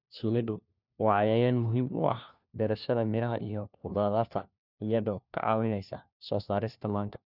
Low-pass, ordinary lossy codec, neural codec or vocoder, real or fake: 5.4 kHz; none; codec, 16 kHz, 1 kbps, FunCodec, trained on LibriTTS, 50 frames a second; fake